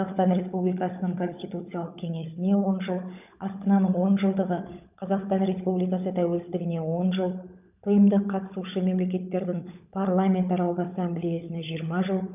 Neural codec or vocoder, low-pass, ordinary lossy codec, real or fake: codec, 16 kHz, 16 kbps, FunCodec, trained on LibriTTS, 50 frames a second; 3.6 kHz; none; fake